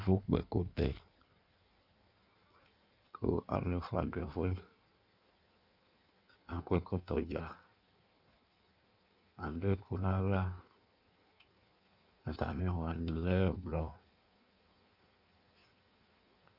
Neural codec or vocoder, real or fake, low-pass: codec, 16 kHz in and 24 kHz out, 1.1 kbps, FireRedTTS-2 codec; fake; 5.4 kHz